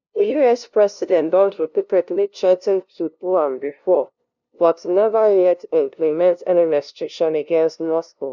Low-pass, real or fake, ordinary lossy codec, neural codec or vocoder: 7.2 kHz; fake; none; codec, 16 kHz, 0.5 kbps, FunCodec, trained on LibriTTS, 25 frames a second